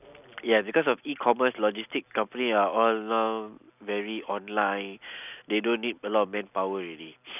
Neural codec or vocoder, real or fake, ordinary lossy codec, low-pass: none; real; none; 3.6 kHz